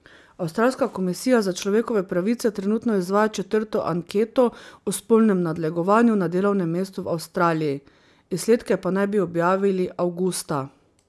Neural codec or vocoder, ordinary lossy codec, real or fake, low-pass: none; none; real; none